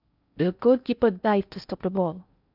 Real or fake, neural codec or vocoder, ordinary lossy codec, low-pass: fake; codec, 16 kHz in and 24 kHz out, 0.6 kbps, FocalCodec, streaming, 4096 codes; none; 5.4 kHz